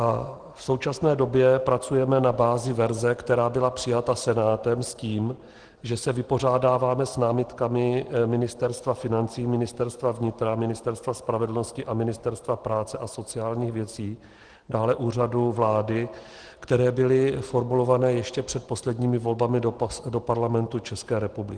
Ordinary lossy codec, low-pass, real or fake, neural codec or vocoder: Opus, 16 kbps; 9.9 kHz; real; none